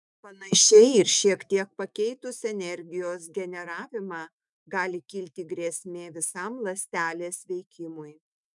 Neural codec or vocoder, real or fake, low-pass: autoencoder, 48 kHz, 128 numbers a frame, DAC-VAE, trained on Japanese speech; fake; 10.8 kHz